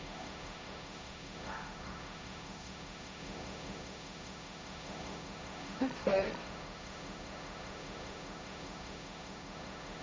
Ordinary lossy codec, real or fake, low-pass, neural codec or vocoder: none; fake; none; codec, 16 kHz, 1.1 kbps, Voila-Tokenizer